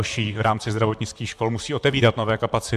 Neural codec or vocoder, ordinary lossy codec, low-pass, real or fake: vocoder, 44.1 kHz, 128 mel bands, Pupu-Vocoder; MP3, 96 kbps; 14.4 kHz; fake